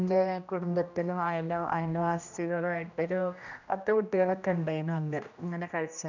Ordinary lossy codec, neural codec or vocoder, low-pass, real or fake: none; codec, 16 kHz, 1 kbps, X-Codec, HuBERT features, trained on general audio; 7.2 kHz; fake